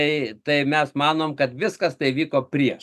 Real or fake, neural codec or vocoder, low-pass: real; none; 14.4 kHz